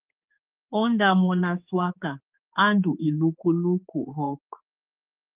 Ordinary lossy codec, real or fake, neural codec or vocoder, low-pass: Opus, 24 kbps; fake; codec, 16 kHz, 4 kbps, X-Codec, HuBERT features, trained on general audio; 3.6 kHz